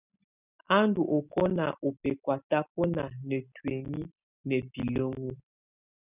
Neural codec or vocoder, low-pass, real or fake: none; 3.6 kHz; real